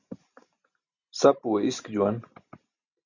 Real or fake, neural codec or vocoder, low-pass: real; none; 7.2 kHz